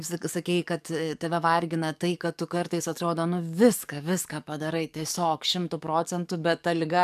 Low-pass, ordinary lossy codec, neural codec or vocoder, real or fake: 14.4 kHz; MP3, 96 kbps; codec, 44.1 kHz, 7.8 kbps, DAC; fake